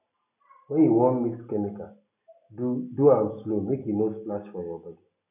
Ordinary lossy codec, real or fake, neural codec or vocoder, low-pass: none; real; none; 3.6 kHz